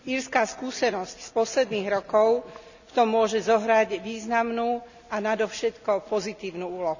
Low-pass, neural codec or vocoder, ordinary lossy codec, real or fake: 7.2 kHz; none; none; real